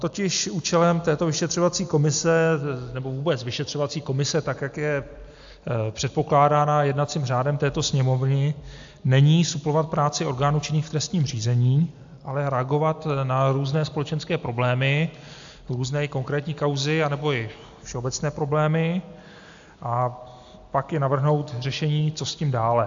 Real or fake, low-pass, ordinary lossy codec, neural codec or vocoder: real; 7.2 kHz; AAC, 64 kbps; none